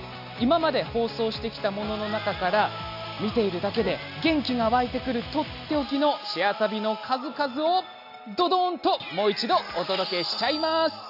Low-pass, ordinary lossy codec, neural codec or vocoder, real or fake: 5.4 kHz; AAC, 48 kbps; none; real